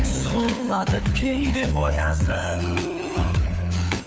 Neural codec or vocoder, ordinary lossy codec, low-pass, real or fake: codec, 16 kHz, 4 kbps, FunCodec, trained on LibriTTS, 50 frames a second; none; none; fake